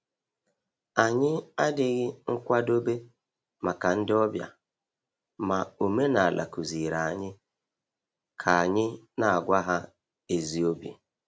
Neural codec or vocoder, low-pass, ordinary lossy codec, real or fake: none; none; none; real